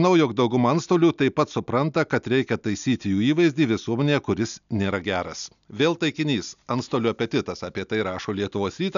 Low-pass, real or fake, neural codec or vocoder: 7.2 kHz; real; none